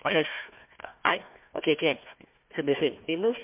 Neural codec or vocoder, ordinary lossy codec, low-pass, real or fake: codec, 16 kHz, 1 kbps, FunCodec, trained on Chinese and English, 50 frames a second; MP3, 32 kbps; 3.6 kHz; fake